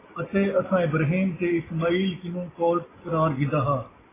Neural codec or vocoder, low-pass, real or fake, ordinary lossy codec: none; 3.6 kHz; real; AAC, 16 kbps